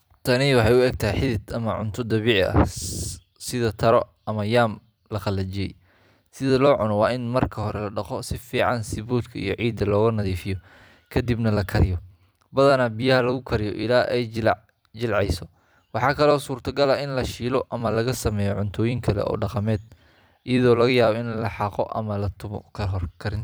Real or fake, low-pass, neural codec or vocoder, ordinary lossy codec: fake; none; vocoder, 44.1 kHz, 128 mel bands every 256 samples, BigVGAN v2; none